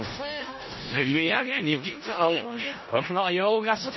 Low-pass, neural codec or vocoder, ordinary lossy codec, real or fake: 7.2 kHz; codec, 16 kHz in and 24 kHz out, 0.4 kbps, LongCat-Audio-Codec, four codebook decoder; MP3, 24 kbps; fake